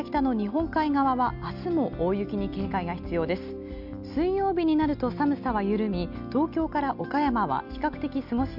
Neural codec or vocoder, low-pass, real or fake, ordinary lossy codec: none; 5.4 kHz; real; none